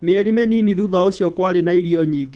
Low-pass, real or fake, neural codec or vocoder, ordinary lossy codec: 9.9 kHz; fake; codec, 24 kHz, 3 kbps, HILCodec; none